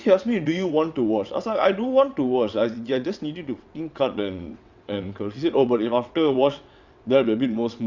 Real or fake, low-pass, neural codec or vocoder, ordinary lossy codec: fake; 7.2 kHz; vocoder, 22.05 kHz, 80 mel bands, WaveNeXt; Opus, 64 kbps